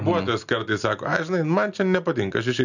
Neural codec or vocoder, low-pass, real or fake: none; 7.2 kHz; real